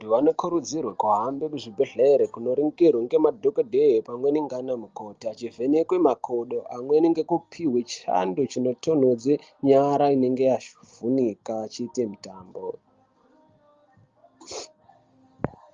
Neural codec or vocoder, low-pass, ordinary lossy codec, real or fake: none; 7.2 kHz; Opus, 32 kbps; real